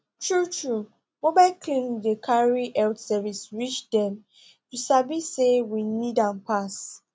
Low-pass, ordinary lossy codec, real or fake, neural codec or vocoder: none; none; real; none